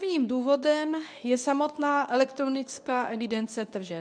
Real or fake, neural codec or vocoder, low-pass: fake; codec, 24 kHz, 0.9 kbps, WavTokenizer, medium speech release version 2; 9.9 kHz